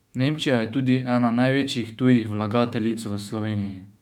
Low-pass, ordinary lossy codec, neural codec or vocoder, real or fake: 19.8 kHz; none; autoencoder, 48 kHz, 32 numbers a frame, DAC-VAE, trained on Japanese speech; fake